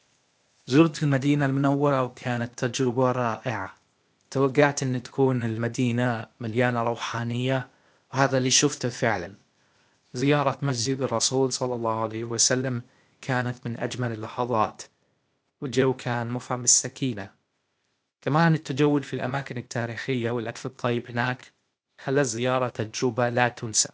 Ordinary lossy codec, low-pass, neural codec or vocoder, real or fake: none; none; codec, 16 kHz, 0.8 kbps, ZipCodec; fake